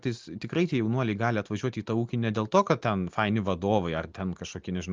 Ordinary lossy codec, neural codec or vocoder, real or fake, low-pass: Opus, 24 kbps; none; real; 7.2 kHz